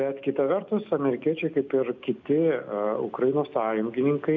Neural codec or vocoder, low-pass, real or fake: none; 7.2 kHz; real